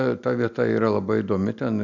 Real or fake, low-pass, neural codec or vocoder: real; 7.2 kHz; none